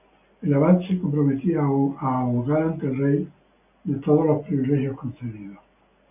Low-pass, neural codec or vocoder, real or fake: 3.6 kHz; none; real